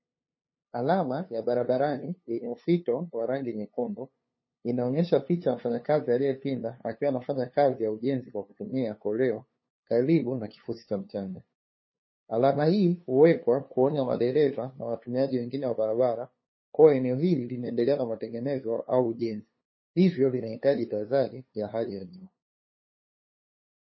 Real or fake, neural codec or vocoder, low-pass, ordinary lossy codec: fake; codec, 16 kHz, 2 kbps, FunCodec, trained on LibriTTS, 25 frames a second; 7.2 kHz; MP3, 24 kbps